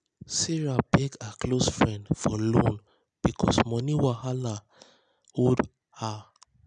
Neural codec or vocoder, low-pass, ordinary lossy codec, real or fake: none; 9.9 kHz; none; real